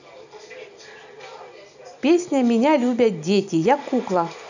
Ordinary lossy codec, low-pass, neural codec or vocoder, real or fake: none; 7.2 kHz; none; real